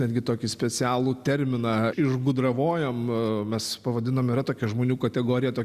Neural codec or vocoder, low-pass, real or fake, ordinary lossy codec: none; 14.4 kHz; real; Opus, 64 kbps